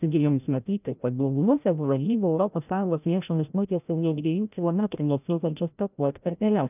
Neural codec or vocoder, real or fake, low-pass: codec, 16 kHz, 0.5 kbps, FreqCodec, larger model; fake; 3.6 kHz